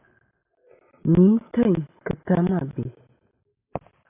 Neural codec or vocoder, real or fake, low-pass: none; real; 3.6 kHz